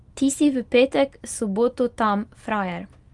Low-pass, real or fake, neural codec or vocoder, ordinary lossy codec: 10.8 kHz; real; none; Opus, 32 kbps